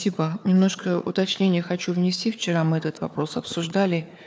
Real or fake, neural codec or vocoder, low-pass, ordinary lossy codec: fake; codec, 16 kHz, 4 kbps, FunCodec, trained on Chinese and English, 50 frames a second; none; none